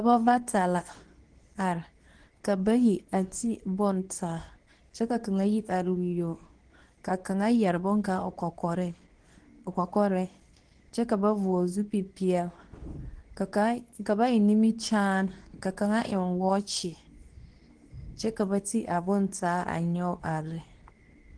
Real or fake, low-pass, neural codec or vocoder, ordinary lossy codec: fake; 9.9 kHz; codec, 24 kHz, 0.9 kbps, WavTokenizer, medium speech release version 2; Opus, 16 kbps